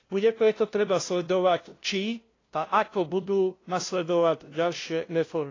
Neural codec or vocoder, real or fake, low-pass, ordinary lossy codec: codec, 16 kHz, 0.5 kbps, FunCodec, trained on LibriTTS, 25 frames a second; fake; 7.2 kHz; AAC, 32 kbps